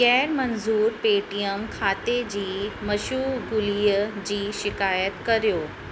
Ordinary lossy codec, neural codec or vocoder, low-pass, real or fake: none; none; none; real